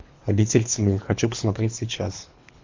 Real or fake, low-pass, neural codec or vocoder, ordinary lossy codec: fake; 7.2 kHz; codec, 24 kHz, 3 kbps, HILCodec; MP3, 48 kbps